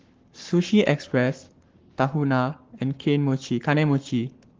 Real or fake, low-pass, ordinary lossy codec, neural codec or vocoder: fake; 7.2 kHz; Opus, 16 kbps; codec, 44.1 kHz, 7.8 kbps, Pupu-Codec